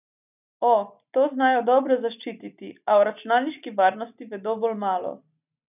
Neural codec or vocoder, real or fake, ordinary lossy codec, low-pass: none; real; none; 3.6 kHz